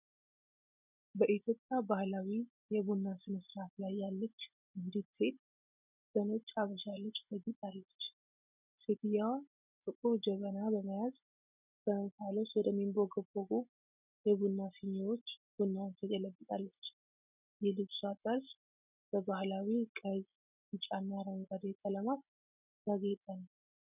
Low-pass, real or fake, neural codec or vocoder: 3.6 kHz; real; none